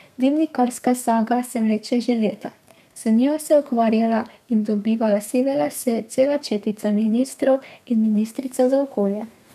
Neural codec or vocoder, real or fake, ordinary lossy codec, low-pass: codec, 32 kHz, 1.9 kbps, SNAC; fake; none; 14.4 kHz